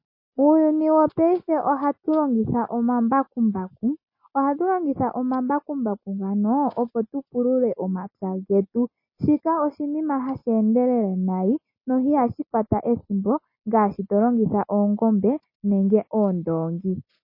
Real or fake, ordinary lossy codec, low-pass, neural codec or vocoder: real; MP3, 24 kbps; 5.4 kHz; none